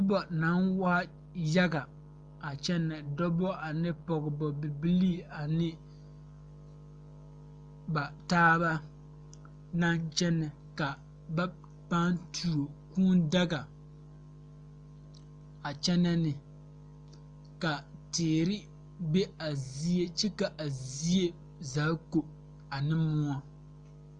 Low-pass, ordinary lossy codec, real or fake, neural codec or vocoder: 9.9 kHz; Opus, 32 kbps; real; none